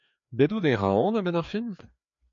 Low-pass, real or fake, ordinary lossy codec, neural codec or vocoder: 7.2 kHz; fake; MP3, 48 kbps; codec, 16 kHz, 2 kbps, FreqCodec, larger model